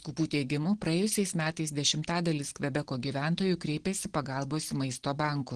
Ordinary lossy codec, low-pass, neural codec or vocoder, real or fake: Opus, 16 kbps; 9.9 kHz; none; real